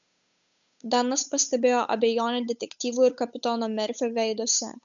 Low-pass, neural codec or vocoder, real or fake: 7.2 kHz; codec, 16 kHz, 8 kbps, FunCodec, trained on Chinese and English, 25 frames a second; fake